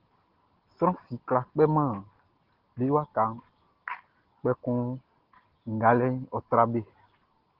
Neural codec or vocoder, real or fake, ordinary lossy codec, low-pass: none; real; Opus, 16 kbps; 5.4 kHz